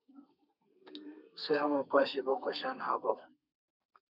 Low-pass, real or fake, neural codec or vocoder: 5.4 kHz; fake; codec, 32 kHz, 1.9 kbps, SNAC